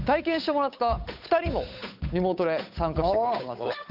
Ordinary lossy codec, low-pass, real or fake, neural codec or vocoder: none; 5.4 kHz; fake; codec, 16 kHz, 8 kbps, FunCodec, trained on Chinese and English, 25 frames a second